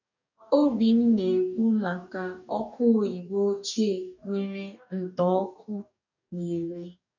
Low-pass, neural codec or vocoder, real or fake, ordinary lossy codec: 7.2 kHz; codec, 44.1 kHz, 2.6 kbps, DAC; fake; none